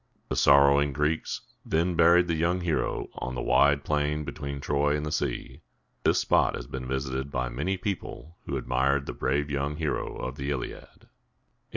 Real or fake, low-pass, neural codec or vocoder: real; 7.2 kHz; none